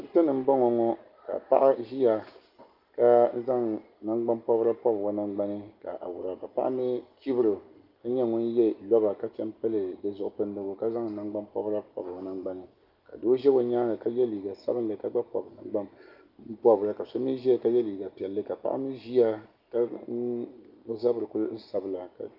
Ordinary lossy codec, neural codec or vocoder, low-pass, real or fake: Opus, 32 kbps; none; 5.4 kHz; real